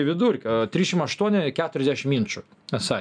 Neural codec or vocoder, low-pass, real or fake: none; 9.9 kHz; real